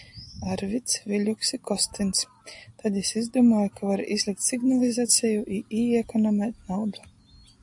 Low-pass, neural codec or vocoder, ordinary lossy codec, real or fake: 10.8 kHz; none; AAC, 64 kbps; real